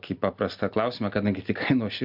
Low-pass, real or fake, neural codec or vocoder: 5.4 kHz; real; none